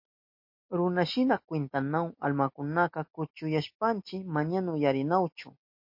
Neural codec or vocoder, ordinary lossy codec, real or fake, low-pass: none; MP3, 32 kbps; real; 5.4 kHz